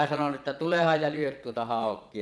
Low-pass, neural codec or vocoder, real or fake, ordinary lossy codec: none; vocoder, 22.05 kHz, 80 mel bands, Vocos; fake; none